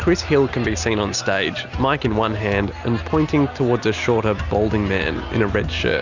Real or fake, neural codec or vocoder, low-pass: real; none; 7.2 kHz